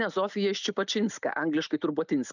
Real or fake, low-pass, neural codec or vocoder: real; 7.2 kHz; none